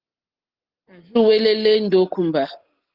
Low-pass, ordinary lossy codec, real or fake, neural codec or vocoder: 5.4 kHz; Opus, 16 kbps; real; none